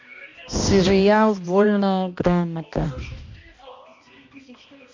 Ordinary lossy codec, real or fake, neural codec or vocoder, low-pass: MP3, 48 kbps; fake; codec, 16 kHz, 1 kbps, X-Codec, HuBERT features, trained on balanced general audio; 7.2 kHz